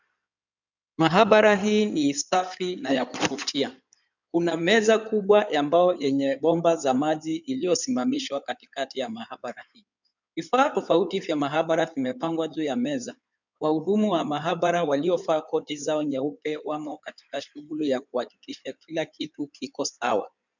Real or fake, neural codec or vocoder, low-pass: fake; codec, 16 kHz in and 24 kHz out, 2.2 kbps, FireRedTTS-2 codec; 7.2 kHz